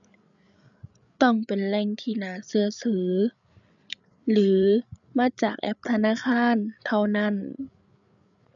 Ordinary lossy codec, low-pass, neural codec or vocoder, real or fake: none; 7.2 kHz; codec, 16 kHz, 8 kbps, FreqCodec, larger model; fake